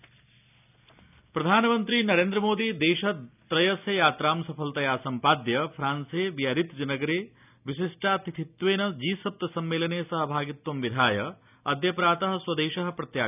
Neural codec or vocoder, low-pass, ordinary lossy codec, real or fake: none; 3.6 kHz; none; real